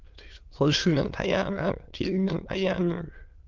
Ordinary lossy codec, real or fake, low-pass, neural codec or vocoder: Opus, 32 kbps; fake; 7.2 kHz; autoencoder, 22.05 kHz, a latent of 192 numbers a frame, VITS, trained on many speakers